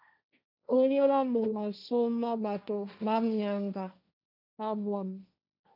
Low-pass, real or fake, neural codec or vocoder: 5.4 kHz; fake; codec, 16 kHz, 1.1 kbps, Voila-Tokenizer